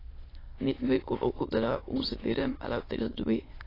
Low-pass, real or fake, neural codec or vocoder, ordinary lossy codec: 5.4 kHz; fake; autoencoder, 22.05 kHz, a latent of 192 numbers a frame, VITS, trained on many speakers; AAC, 24 kbps